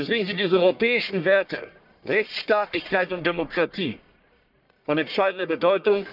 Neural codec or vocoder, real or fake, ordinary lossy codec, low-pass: codec, 44.1 kHz, 1.7 kbps, Pupu-Codec; fake; none; 5.4 kHz